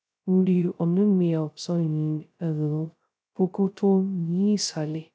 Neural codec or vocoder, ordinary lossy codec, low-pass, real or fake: codec, 16 kHz, 0.2 kbps, FocalCodec; none; none; fake